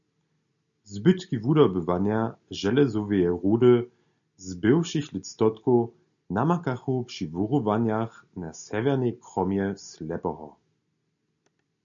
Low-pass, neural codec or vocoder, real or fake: 7.2 kHz; none; real